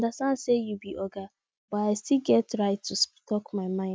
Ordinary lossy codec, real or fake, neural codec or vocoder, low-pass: none; real; none; none